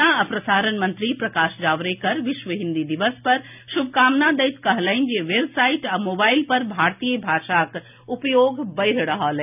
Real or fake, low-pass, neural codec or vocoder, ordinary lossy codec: real; 3.6 kHz; none; none